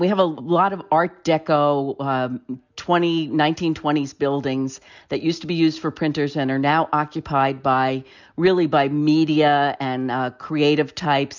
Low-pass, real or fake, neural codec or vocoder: 7.2 kHz; real; none